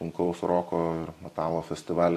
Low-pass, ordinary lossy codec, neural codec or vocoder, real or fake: 14.4 kHz; AAC, 64 kbps; vocoder, 44.1 kHz, 128 mel bands every 256 samples, BigVGAN v2; fake